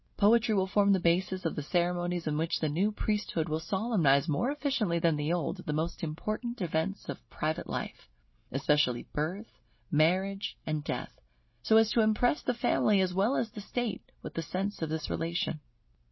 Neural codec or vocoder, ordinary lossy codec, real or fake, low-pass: none; MP3, 24 kbps; real; 7.2 kHz